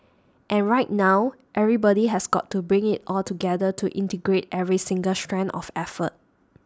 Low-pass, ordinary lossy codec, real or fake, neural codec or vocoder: none; none; real; none